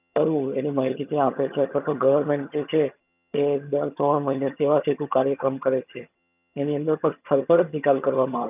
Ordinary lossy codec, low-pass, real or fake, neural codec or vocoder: none; 3.6 kHz; fake; vocoder, 22.05 kHz, 80 mel bands, HiFi-GAN